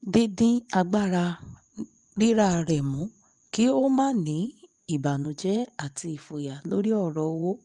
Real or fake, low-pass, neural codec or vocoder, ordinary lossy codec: fake; 10.8 kHz; vocoder, 24 kHz, 100 mel bands, Vocos; Opus, 32 kbps